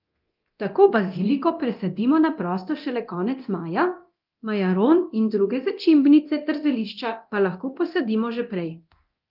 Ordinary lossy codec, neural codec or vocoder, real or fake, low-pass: Opus, 32 kbps; codec, 24 kHz, 0.9 kbps, DualCodec; fake; 5.4 kHz